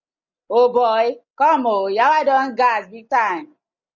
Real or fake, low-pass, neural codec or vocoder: real; 7.2 kHz; none